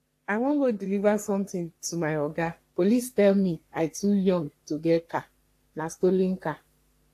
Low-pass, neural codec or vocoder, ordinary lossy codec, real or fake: 14.4 kHz; codec, 44.1 kHz, 3.4 kbps, Pupu-Codec; AAC, 64 kbps; fake